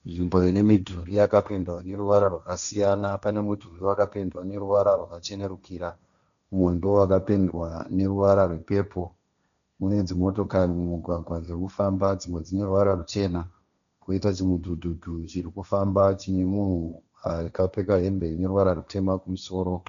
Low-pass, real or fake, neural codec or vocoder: 7.2 kHz; fake; codec, 16 kHz, 1.1 kbps, Voila-Tokenizer